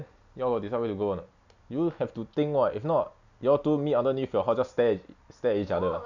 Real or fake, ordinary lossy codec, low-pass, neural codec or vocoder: real; none; 7.2 kHz; none